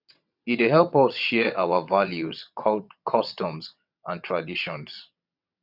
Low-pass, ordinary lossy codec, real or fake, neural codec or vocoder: 5.4 kHz; none; fake; vocoder, 22.05 kHz, 80 mel bands, WaveNeXt